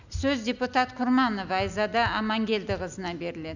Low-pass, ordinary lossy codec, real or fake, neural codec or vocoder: 7.2 kHz; none; real; none